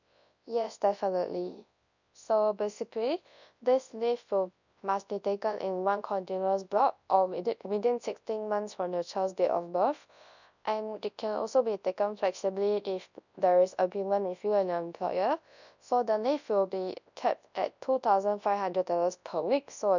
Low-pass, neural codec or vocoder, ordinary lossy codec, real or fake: 7.2 kHz; codec, 24 kHz, 0.9 kbps, WavTokenizer, large speech release; none; fake